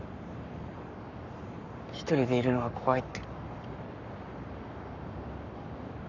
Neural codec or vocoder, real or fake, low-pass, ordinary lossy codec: codec, 44.1 kHz, 7.8 kbps, Pupu-Codec; fake; 7.2 kHz; none